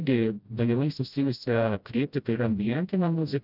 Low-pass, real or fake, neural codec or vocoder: 5.4 kHz; fake; codec, 16 kHz, 0.5 kbps, FreqCodec, smaller model